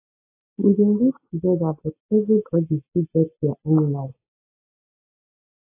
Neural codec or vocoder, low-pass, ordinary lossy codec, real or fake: none; 3.6 kHz; AAC, 24 kbps; real